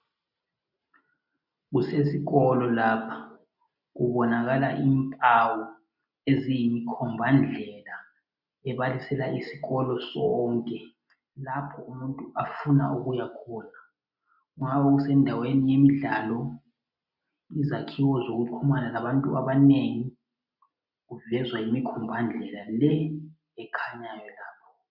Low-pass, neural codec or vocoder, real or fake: 5.4 kHz; none; real